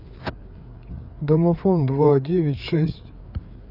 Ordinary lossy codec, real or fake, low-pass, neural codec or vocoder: none; fake; 5.4 kHz; codec, 16 kHz, 4 kbps, FreqCodec, larger model